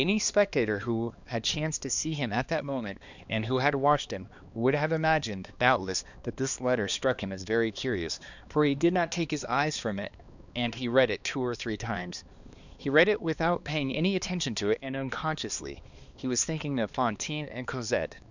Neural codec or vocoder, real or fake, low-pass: codec, 16 kHz, 2 kbps, X-Codec, HuBERT features, trained on balanced general audio; fake; 7.2 kHz